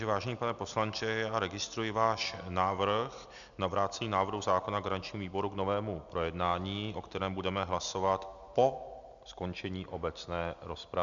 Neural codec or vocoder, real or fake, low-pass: none; real; 7.2 kHz